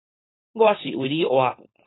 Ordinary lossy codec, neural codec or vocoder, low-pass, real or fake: AAC, 16 kbps; codec, 16 kHz, 4.8 kbps, FACodec; 7.2 kHz; fake